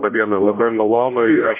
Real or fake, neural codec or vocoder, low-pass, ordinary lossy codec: fake; codec, 16 kHz, 0.5 kbps, X-Codec, HuBERT features, trained on general audio; 3.6 kHz; MP3, 24 kbps